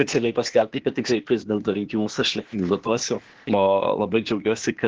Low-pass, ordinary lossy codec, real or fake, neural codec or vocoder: 7.2 kHz; Opus, 16 kbps; fake; codec, 16 kHz, 0.8 kbps, ZipCodec